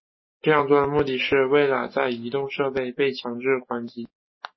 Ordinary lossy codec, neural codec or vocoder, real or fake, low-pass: MP3, 24 kbps; none; real; 7.2 kHz